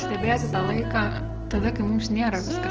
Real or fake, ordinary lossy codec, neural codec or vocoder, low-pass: real; Opus, 16 kbps; none; 7.2 kHz